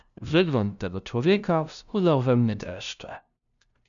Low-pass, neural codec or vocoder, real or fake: 7.2 kHz; codec, 16 kHz, 0.5 kbps, FunCodec, trained on LibriTTS, 25 frames a second; fake